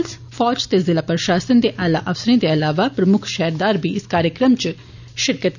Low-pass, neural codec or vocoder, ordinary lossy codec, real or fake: 7.2 kHz; none; none; real